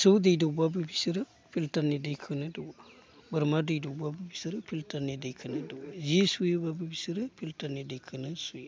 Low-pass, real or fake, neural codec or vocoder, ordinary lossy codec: none; real; none; none